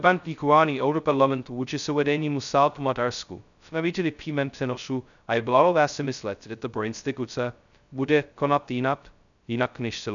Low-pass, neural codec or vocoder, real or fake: 7.2 kHz; codec, 16 kHz, 0.2 kbps, FocalCodec; fake